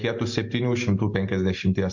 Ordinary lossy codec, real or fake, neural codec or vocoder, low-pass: MP3, 48 kbps; real; none; 7.2 kHz